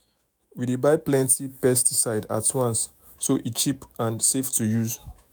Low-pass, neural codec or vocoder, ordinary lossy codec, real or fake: none; autoencoder, 48 kHz, 128 numbers a frame, DAC-VAE, trained on Japanese speech; none; fake